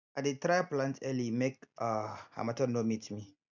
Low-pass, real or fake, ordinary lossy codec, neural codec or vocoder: 7.2 kHz; real; none; none